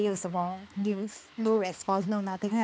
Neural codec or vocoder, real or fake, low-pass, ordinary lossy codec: codec, 16 kHz, 2 kbps, X-Codec, HuBERT features, trained on balanced general audio; fake; none; none